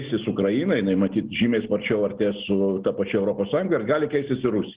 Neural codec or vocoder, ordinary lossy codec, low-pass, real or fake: none; Opus, 16 kbps; 3.6 kHz; real